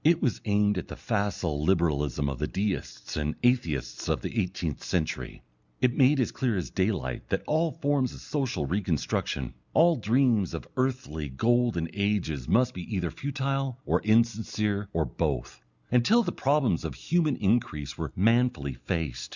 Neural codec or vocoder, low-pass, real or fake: none; 7.2 kHz; real